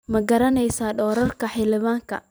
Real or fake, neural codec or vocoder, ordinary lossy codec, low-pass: fake; vocoder, 44.1 kHz, 128 mel bands every 256 samples, BigVGAN v2; none; none